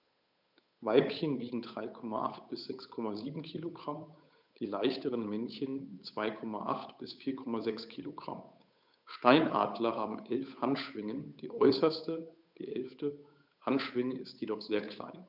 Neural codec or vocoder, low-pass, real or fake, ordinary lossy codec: codec, 16 kHz, 8 kbps, FunCodec, trained on Chinese and English, 25 frames a second; 5.4 kHz; fake; none